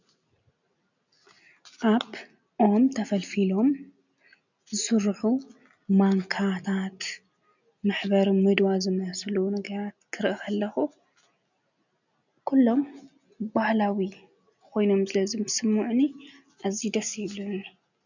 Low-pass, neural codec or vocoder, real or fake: 7.2 kHz; none; real